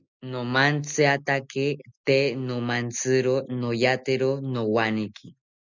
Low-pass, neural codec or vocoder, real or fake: 7.2 kHz; none; real